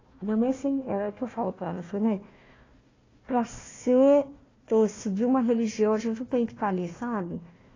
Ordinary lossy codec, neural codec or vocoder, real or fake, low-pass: AAC, 32 kbps; codec, 16 kHz, 1 kbps, FunCodec, trained on Chinese and English, 50 frames a second; fake; 7.2 kHz